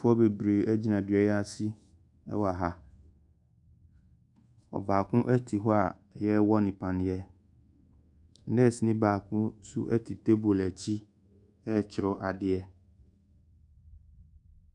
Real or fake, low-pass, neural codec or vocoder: fake; 10.8 kHz; codec, 24 kHz, 1.2 kbps, DualCodec